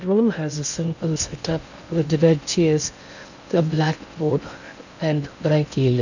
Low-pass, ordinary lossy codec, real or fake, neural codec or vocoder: 7.2 kHz; none; fake; codec, 16 kHz in and 24 kHz out, 0.6 kbps, FocalCodec, streaming, 2048 codes